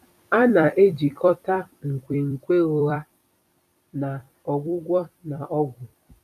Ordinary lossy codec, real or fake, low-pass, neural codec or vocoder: none; fake; 14.4 kHz; vocoder, 44.1 kHz, 128 mel bands every 256 samples, BigVGAN v2